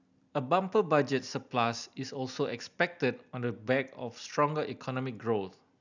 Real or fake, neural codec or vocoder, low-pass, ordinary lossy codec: real; none; 7.2 kHz; none